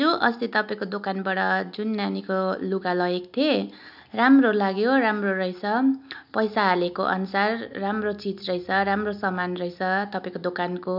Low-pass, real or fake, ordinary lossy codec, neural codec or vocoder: 5.4 kHz; real; none; none